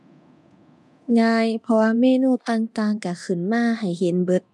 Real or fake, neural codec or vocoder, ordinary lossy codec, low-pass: fake; codec, 24 kHz, 0.9 kbps, DualCodec; none; none